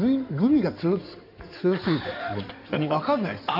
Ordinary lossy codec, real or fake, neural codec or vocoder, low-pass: none; fake; codec, 16 kHz in and 24 kHz out, 2.2 kbps, FireRedTTS-2 codec; 5.4 kHz